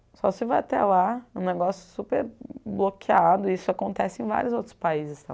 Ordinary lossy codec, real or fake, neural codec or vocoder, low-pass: none; real; none; none